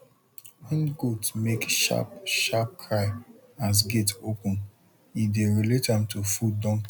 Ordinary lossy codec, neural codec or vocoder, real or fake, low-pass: none; none; real; 19.8 kHz